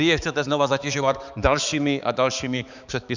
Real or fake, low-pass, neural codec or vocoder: fake; 7.2 kHz; codec, 16 kHz, 4 kbps, X-Codec, HuBERT features, trained on balanced general audio